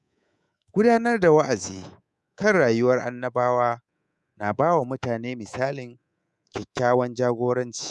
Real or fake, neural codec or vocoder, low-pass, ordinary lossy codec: fake; codec, 24 kHz, 3.1 kbps, DualCodec; none; none